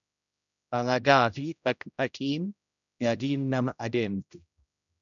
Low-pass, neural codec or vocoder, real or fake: 7.2 kHz; codec, 16 kHz, 0.5 kbps, X-Codec, HuBERT features, trained on general audio; fake